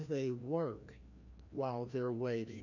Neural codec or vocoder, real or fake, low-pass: codec, 16 kHz, 1 kbps, FreqCodec, larger model; fake; 7.2 kHz